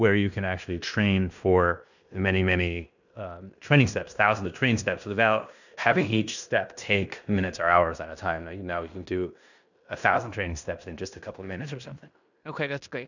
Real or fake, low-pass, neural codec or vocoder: fake; 7.2 kHz; codec, 16 kHz in and 24 kHz out, 0.9 kbps, LongCat-Audio-Codec, fine tuned four codebook decoder